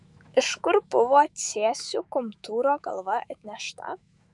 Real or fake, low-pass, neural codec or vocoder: fake; 10.8 kHz; autoencoder, 48 kHz, 128 numbers a frame, DAC-VAE, trained on Japanese speech